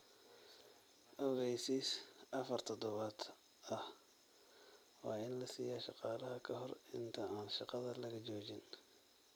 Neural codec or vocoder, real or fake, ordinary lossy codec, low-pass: vocoder, 44.1 kHz, 128 mel bands every 256 samples, BigVGAN v2; fake; none; none